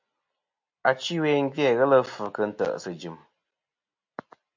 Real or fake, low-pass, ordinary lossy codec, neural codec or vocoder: real; 7.2 kHz; MP3, 48 kbps; none